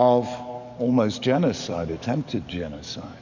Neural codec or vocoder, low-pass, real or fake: codec, 44.1 kHz, 7.8 kbps, DAC; 7.2 kHz; fake